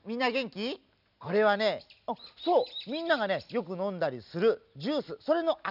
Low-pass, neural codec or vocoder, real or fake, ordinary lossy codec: 5.4 kHz; none; real; none